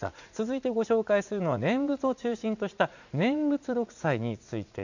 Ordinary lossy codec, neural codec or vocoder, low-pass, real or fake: none; vocoder, 44.1 kHz, 80 mel bands, Vocos; 7.2 kHz; fake